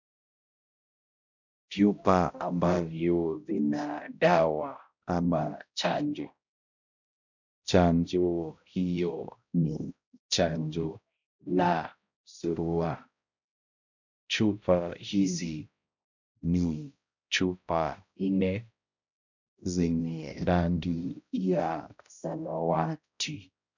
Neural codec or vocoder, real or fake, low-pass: codec, 16 kHz, 0.5 kbps, X-Codec, HuBERT features, trained on balanced general audio; fake; 7.2 kHz